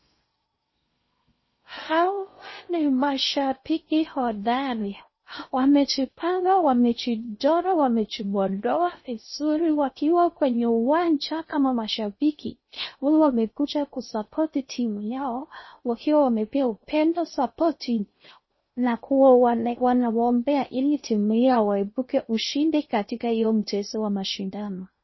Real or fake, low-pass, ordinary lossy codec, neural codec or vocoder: fake; 7.2 kHz; MP3, 24 kbps; codec, 16 kHz in and 24 kHz out, 0.6 kbps, FocalCodec, streaming, 4096 codes